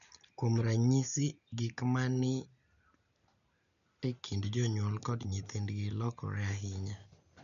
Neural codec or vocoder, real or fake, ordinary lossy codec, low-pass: none; real; none; 7.2 kHz